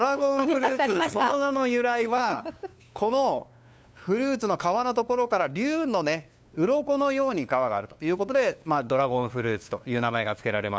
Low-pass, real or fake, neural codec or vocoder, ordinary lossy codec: none; fake; codec, 16 kHz, 2 kbps, FunCodec, trained on LibriTTS, 25 frames a second; none